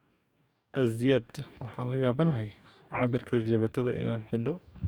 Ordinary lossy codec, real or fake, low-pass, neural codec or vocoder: none; fake; none; codec, 44.1 kHz, 2.6 kbps, DAC